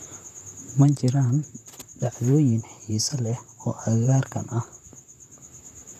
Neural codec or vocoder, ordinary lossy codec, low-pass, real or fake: vocoder, 44.1 kHz, 128 mel bands, Pupu-Vocoder; none; 14.4 kHz; fake